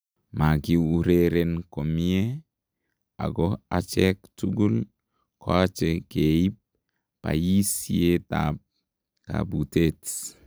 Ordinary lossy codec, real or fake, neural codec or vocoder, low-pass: none; real; none; none